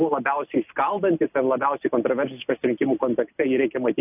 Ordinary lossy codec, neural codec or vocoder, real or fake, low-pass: AAC, 32 kbps; none; real; 3.6 kHz